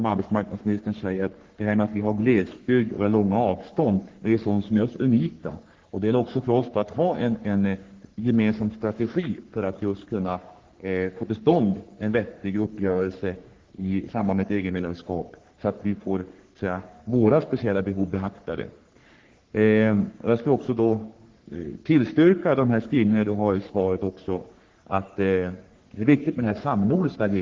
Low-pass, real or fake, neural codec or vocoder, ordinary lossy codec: 7.2 kHz; fake; codec, 44.1 kHz, 3.4 kbps, Pupu-Codec; Opus, 16 kbps